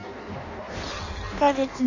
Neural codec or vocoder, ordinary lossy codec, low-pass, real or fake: codec, 16 kHz in and 24 kHz out, 0.6 kbps, FireRedTTS-2 codec; none; 7.2 kHz; fake